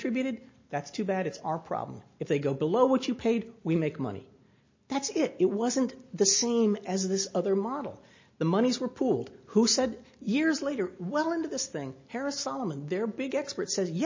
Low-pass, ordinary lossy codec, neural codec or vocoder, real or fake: 7.2 kHz; MP3, 32 kbps; none; real